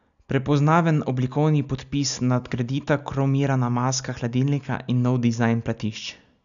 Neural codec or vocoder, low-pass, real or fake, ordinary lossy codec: none; 7.2 kHz; real; none